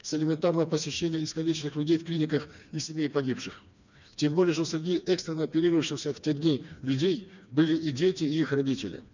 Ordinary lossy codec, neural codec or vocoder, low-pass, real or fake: none; codec, 16 kHz, 2 kbps, FreqCodec, smaller model; 7.2 kHz; fake